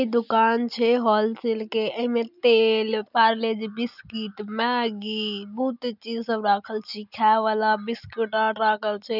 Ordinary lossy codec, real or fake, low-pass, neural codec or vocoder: none; real; 5.4 kHz; none